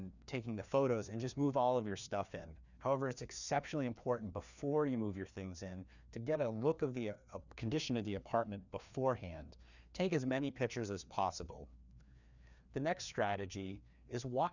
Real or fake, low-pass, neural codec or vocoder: fake; 7.2 kHz; codec, 16 kHz, 2 kbps, FreqCodec, larger model